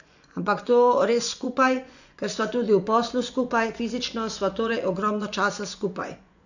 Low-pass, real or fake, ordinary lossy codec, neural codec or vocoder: 7.2 kHz; fake; none; vocoder, 24 kHz, 100 mel bands, Vocos